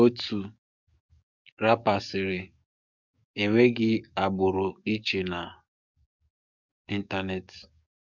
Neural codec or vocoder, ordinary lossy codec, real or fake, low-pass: codec, 44.1 kHz, 7.8 kbps, DAC; none; fake; 7.2 kHz